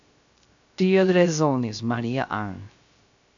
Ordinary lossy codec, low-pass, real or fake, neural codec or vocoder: MP3, 64 kbps; 7.2 kHz; fake; codec, 16 kHz, 0.3 kbps, FocalCodec